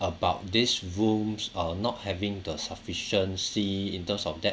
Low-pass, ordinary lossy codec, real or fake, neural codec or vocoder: none; none; real; none